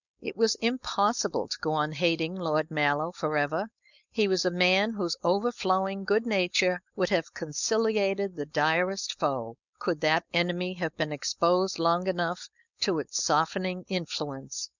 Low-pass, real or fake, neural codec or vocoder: 7.2 kHz; fake; codec, 16 kHz, 4.8 kbps, FACodec